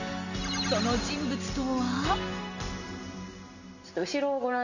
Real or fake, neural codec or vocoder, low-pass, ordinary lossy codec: real; none; 7.2 kHz; none